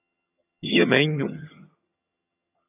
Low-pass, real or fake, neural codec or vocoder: 3.6 kHz; fake; vocoder, 22.05 kHz, 80 mel bands, HiFi-GAN